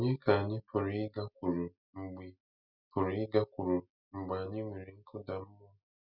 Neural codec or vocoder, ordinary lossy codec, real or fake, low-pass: none; none; real; 5.4 kHz